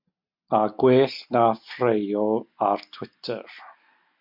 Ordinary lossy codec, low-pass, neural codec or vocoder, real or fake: MP3, 48 kbps; 5.4 kHz; none; real